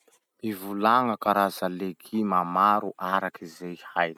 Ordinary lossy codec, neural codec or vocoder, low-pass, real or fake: none; none; 19.8 kHz; real